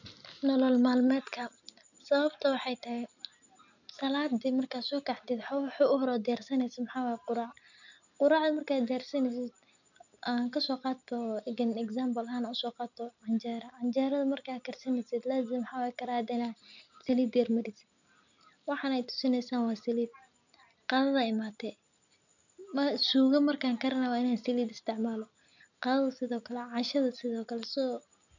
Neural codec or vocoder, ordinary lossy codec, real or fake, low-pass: none; none; real; 7.2 kHz